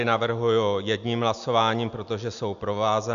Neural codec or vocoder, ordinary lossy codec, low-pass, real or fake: none; MP3, 96 kbps; 7.2 kHz; real